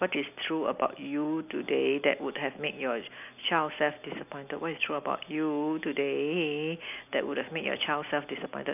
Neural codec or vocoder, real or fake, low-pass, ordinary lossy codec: none; real; 3.6 kHz; none